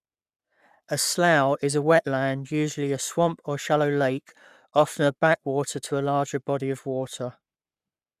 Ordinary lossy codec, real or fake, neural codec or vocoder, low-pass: none; fake; codec, 44.1 kHz, 7.8 kbps, Pupu-Codec; 14.4 kHz